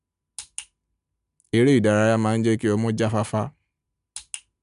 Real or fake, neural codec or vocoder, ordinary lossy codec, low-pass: real; none; none; 10.8 kHz